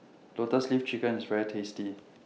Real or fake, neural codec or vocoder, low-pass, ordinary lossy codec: real; none; none; none